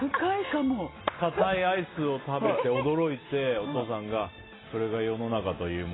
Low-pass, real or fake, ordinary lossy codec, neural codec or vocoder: 7.2 kHz; real; AAC, 16 kbps; none